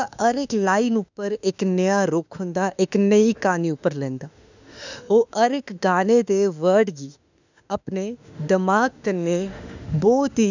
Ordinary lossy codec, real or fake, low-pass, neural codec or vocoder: none; fake; 7.2 kHz; autoencoder, 48 kHz, 32 numbers a frame, DAC-VAE, trained on Japanese speech